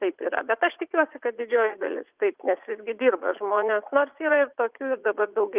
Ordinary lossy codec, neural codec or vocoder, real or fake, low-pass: Opus, 24 kbps; vocoder, 44.1 kHz, 80 mel bands, Vocos; fake; 3.6 kHz